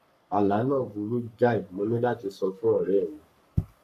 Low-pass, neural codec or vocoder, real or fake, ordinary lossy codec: 14.4 kHz; codec, 44.1 kHz, 3.4 kbps, Pupu-Codec; fake; none